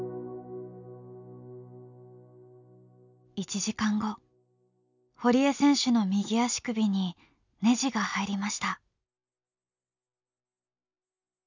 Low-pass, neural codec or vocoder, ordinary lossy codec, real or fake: 7.2 kHz; none; none; real